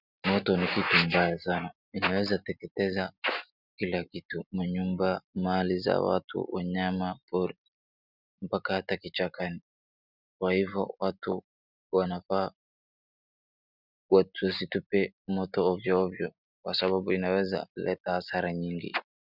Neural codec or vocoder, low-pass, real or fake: none; 5.4 kHz; real